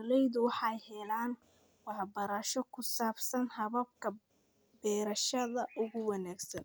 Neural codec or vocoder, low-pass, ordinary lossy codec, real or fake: none; none; none; real